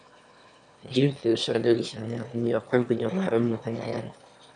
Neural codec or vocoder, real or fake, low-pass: autoencoder, 22.05 kHz, a latent of 192 numbers a frame, VITS, trained on one speaker; fake; 9.9 kHz